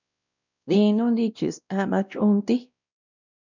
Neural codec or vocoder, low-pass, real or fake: codec, 16 kHz, 1 kbps, X-Codec, WavLM features, trained on Multilingual LibriSpeech; 7.2 kHz; fake